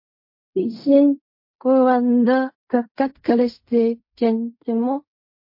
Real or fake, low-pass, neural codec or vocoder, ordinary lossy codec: fake; 5.4 kHz; codec, 16 kHz in and 24 kHz out, 0.4 kbps, LongCat-Audio-Codec, fine tuned four codebook decoder; MP3, 32 kbps